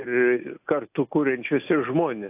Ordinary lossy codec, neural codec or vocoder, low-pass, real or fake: AAC, 32 kbps; none; 3.6 kHz; real